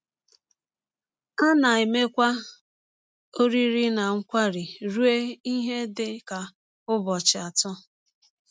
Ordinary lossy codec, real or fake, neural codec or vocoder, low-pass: none; real; none; none